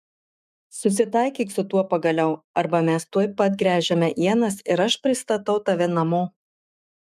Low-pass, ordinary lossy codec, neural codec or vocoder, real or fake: 14.4 kHz; MP3, 96 kbps; autoencoder, 48 kHz, 128 numbers a frame, DAC-VAE, trained on Japanese speech; fake